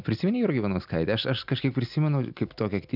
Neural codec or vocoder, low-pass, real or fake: none; 5.4 kHz; real